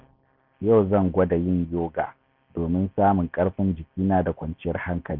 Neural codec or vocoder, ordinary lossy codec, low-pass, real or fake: none; none; 5.4 kHz; real